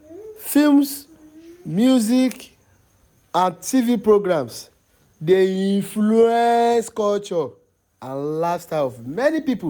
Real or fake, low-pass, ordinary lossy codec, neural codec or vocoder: real; none; none; none